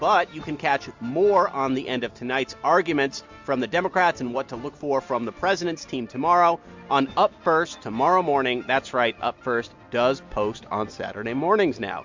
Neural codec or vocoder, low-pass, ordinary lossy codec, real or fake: none; 7.2 kHz; MP3, 64 kbps; real